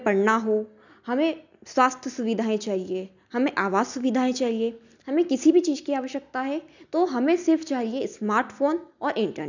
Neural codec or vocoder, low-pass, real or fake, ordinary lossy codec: none; 7.2 kHz; real; none